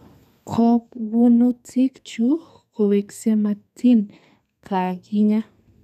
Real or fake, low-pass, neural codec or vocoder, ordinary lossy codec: fake; 14.4 kHz; codec, 32 kHz, 1.9 kbps, SNAC; none